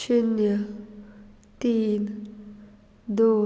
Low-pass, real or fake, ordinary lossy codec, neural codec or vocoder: none; real; none; none